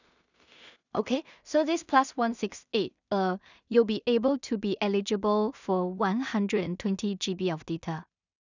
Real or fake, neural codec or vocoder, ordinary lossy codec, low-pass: fake; codec, 16 kHz in and 24 kHz out, 0.4 kbps, LongCat-Audio-Codec, two codebook decoder; none; 7.2 kHz